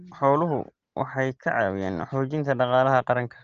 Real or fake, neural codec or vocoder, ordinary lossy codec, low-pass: real; none; Opus, 16 kbps; 7.2 kHz